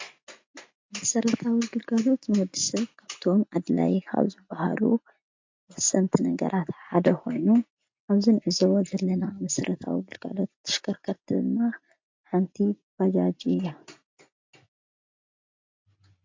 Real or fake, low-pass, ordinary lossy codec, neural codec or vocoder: real; 7.2 kHz; MP3, 48 kbps; none